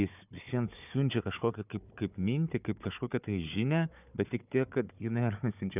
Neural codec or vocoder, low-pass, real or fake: codec, 16 kHz, 4 kbps, FunCodec, trained on Chinese and English, 50 frames a second; 3.6 kHz; fake